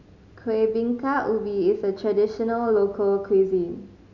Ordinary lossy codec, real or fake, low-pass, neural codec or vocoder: none; real; 7.2 kHz; none